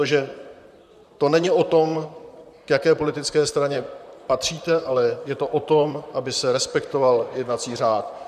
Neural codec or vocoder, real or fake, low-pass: vocoder, 44.1 kHz, 128 mel bands, Pupu-Vocoder; fake; 14.4 kHz